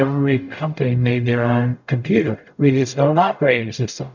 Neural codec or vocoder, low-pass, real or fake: codec, 44.1 kHz, 0.9 kbps, DAC; 7.2 kHz; fake